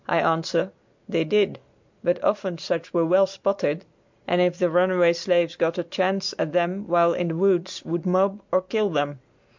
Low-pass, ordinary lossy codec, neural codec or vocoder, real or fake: 7.2 kHz; MP3, 64 kbps; none; real